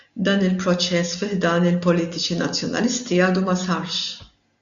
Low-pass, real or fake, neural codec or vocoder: 7.2 kHz; real; none